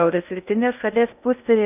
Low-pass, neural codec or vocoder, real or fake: 3.6 kHz; codec, 16 kHz in and 24 kHz out, 0.6 kbps, FocalCodec, streaming, 2048 codes; fake